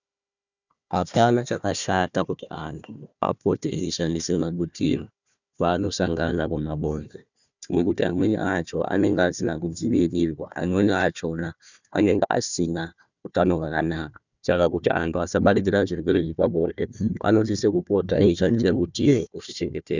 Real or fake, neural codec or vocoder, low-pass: fake; codec, 16 kHz, 1 kbps, FunCodec, trained on Chinese and English, 50 frames a second; 7.2 kHz